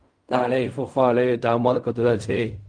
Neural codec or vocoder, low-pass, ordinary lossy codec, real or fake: codec, 16 kHz in and 24 kHz out, 0.4 kbps, LongCat-Audio-Codec, fine tuned four codebook decoder; 9.9 kHz; Opus, 32 kbps; fake